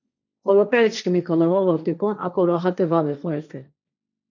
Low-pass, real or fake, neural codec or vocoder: 7.2 kHz; fake; codec, 16 kHz, 1.1 kbps, Voila-Tokenizer